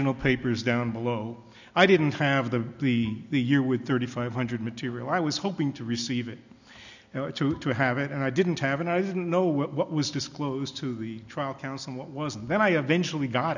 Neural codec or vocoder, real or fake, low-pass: none; real; 7.2 kHz